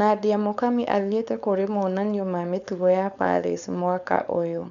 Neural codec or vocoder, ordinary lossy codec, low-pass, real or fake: codec, 16 kHz, 4.8 kbps, FACodec; none; 7.2 kHz; fake